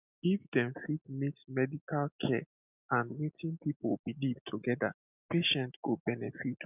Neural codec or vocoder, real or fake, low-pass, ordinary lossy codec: none; real; 3.6 kHz; none